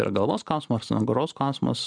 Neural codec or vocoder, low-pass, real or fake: none; 9.9 kHz; real